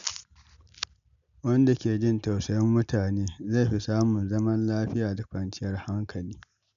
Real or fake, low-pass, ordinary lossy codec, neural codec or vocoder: real; 7.2 kHz; none; none